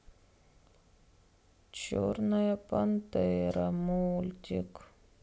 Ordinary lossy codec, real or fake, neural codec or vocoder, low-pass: none; real; none; none